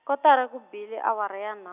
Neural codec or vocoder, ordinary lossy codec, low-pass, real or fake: none; none; 3.6 kHz; real